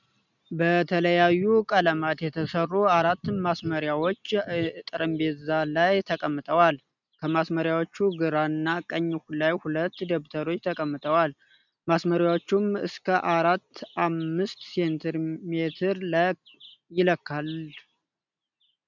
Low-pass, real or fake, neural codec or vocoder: 7.2 kHz; real; none